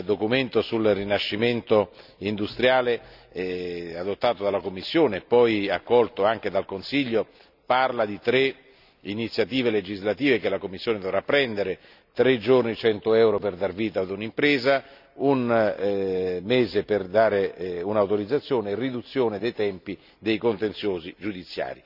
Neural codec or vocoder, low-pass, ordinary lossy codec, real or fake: none; 5.4 kHz; none; real